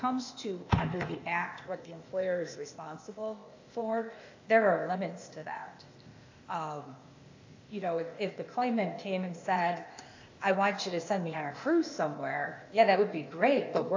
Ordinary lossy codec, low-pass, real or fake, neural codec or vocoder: AAC, 48 kbps; 7.2 kHz; fake; codec, 16 kHz, 0.8 kbps, ZipCodec